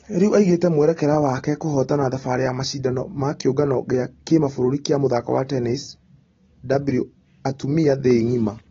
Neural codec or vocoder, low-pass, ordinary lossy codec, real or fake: none; 7.2 kHz; AAC, 24 kbps; real